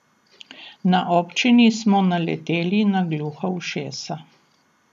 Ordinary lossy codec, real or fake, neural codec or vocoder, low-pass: none; real; none; 14.4 kHz